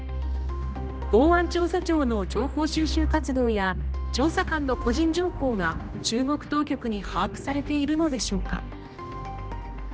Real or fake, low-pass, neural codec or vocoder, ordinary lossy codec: fake; none; codec, 16 kHz, 1 kbps, X-Codec, HuBERT features, trained on general audio; none